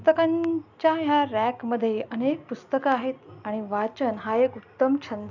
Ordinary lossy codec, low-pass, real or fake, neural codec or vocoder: none; 7.2 kHz; real; none